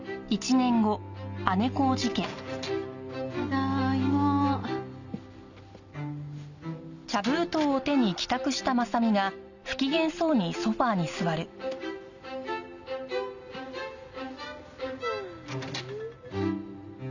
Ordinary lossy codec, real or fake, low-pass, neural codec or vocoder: none; real; 7.2 kHz; none